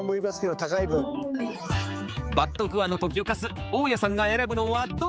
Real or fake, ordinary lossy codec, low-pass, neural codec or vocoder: fake; none; none; codec, 16 kHz, 4 kbps, X-Codec, HuBERT features, trained on general audio